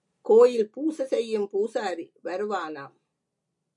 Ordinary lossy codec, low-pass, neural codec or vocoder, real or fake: MP3, 48 kbps; 10.8 kHz; none; real